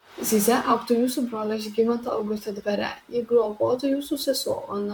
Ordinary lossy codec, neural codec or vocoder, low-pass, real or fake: MP3, 96 kbps; vocoder, 44.1 kHz, 128 mel bands, Pupu-Vocoder; 19.8 kHz; fake